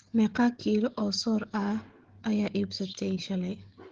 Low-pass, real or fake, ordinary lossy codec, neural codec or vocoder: 7.2 kHz; fake; Opus, 16 kbps; codec, 16 kHz, 16 kbps, FreqCodec, smaller model